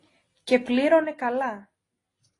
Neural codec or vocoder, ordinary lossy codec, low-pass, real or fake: none; AAC, 32 kbps; 10.8 kHz; real